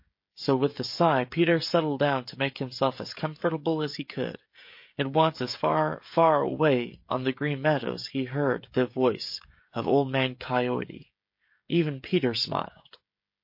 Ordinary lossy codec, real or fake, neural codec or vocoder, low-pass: MP3, 32 kbps; fake; codec, 16 kHz, 16 kbps, FreqCodec, smaller model; 5.4 kHz